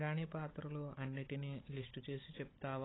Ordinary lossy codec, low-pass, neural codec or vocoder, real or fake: AAC, 16 kbps; 7.2 kHz; codec, 16 kHz, 16 kbps, FunCodec, trained on Chinese and English, 50 frames a second; fake